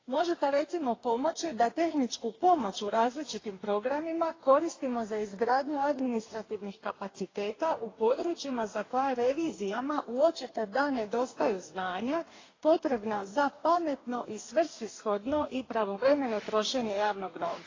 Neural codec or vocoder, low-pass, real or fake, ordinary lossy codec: codec, 44.1 kHz, 2.6 kbps, DAC; 7.2 kHz; fake; AAC, 32 kbps